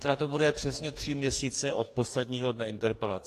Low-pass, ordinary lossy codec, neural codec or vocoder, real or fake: 14.4 kHz; AAC, 64 kbps; codec, 44.1 kHz, 2.6 kbps, DAC; fake